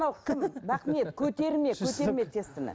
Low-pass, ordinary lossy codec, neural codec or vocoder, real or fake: none; none; none; real